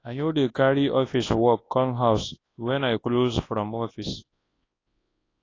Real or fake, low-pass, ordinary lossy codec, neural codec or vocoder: fake; 7.2 kHz; AAC, 32 kbps; codec, 24 kHz, 0.9 kbps, WavTokenizer, large speech release